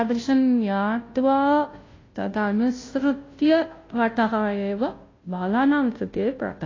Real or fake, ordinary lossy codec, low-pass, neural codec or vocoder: fake; AAC, 32 kbps; 7.2 kHz; codec, 16 kHz, 0.5 kbps, FunCodec, trained on Chinese and English, 25 frames a second